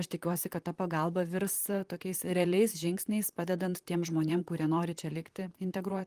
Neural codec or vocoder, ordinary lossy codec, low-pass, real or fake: vocoder, 44.1 kHz, 128 mel bands, Pupu-Vocoder; Opus, 24 kbps; 14.4 kHz; fake